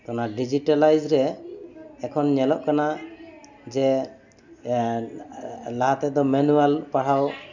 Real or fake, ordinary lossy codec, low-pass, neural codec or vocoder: real; none; 7.2 kHz; none